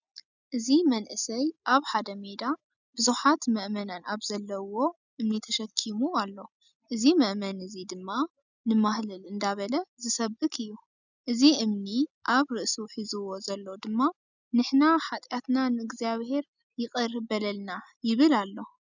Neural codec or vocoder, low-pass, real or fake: none; 7.2 kHz; real